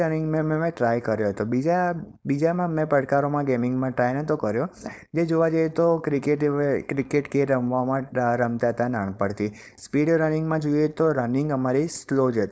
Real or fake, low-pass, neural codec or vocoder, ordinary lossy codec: fake; none; codec, 16 kHz, 4.8 kbps, FACodec; none